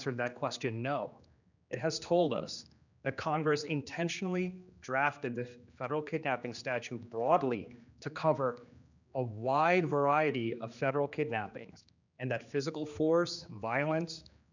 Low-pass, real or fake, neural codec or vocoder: 7.2 kHz; fake; codec, 16 kHz, 2 kbps, X-Codec, HuBERT features, trained on general audio